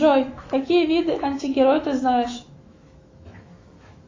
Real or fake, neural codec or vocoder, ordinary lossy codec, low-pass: fake; autoencoder, 48 kHz, 128 numbers a frame, DAC-VAE, trained on Japanese speech; AAC, 32 kbps; 7.2 kHz